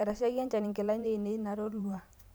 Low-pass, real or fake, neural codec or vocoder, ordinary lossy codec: none; fake; vocoder, 44.1 kHz, 128 mel bands every 512 samples, BigVGAN v2; none